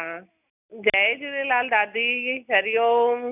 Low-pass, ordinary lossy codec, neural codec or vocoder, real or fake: 3.6 kHz; none; none; real